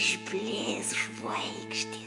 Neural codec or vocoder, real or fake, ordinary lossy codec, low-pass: vocoder, 48 kHz, 128 mel bands, Vocos; fake; MP3, 64 kbps; 10.8 kHz